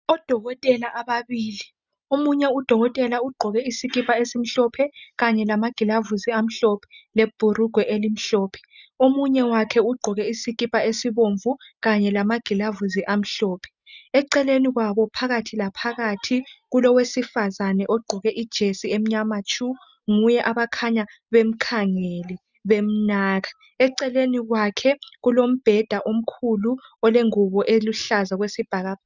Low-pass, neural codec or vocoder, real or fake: 7.2 kHz; none; real